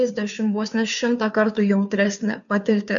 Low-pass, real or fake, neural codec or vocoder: 7.2 kHz; fake; codec, 16 kHz, 2 kbps, FunCodec, trained on Chinese and English, 25 frames a second